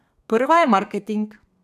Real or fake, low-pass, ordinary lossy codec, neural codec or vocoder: fake; 14.4 kHz; none; codec, 32 kHz, 1.9 kbps, SNAC